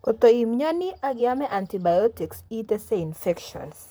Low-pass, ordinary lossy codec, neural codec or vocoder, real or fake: none; none; vocoder, 44.1 kHz, 128 mel bands, Pupu-Vocoder; fake